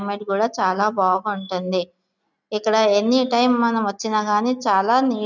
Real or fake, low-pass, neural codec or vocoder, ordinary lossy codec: real; 7.2 kHz; none; none